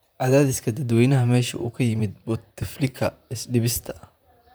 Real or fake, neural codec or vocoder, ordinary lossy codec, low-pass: fake; vocoder, 44.1 kHz, 128 mel bands, Pupu-Vocoder; none; none